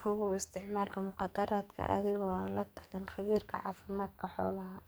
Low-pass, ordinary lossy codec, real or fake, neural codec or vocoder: none; none; fake; codec, 44.1 kHz, 2.6 kbps, SNAC